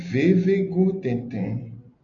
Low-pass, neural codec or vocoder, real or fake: 7.2 kHz; none; real